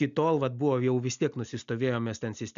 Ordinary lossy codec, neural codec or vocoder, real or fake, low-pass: MP3, 96 kbps; none; real; 7.2 kHz